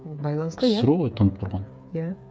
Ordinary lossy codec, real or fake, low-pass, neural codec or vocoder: none; fake; none; codec, 16 kHz, 8 kbps, FreqCodec, smaller model